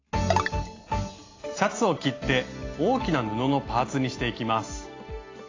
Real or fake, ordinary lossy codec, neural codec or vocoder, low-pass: real; AAC, 32 kbps; none; 7.2 kHz